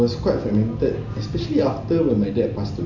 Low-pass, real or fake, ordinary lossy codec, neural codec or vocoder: 7.2 kHz; real; none; none